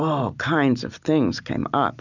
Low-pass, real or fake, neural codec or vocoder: 7.2 kHz; real; none